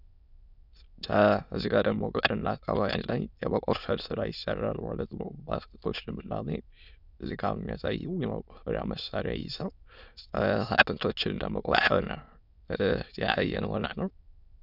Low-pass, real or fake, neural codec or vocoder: 5.4 kHz; fake; autoencoder, 22.05 kHz, a latent of 192 numbers a frame, VITS, trained on many speakers